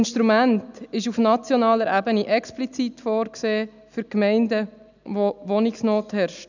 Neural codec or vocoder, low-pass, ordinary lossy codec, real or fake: none; 7.2 kHz; none; real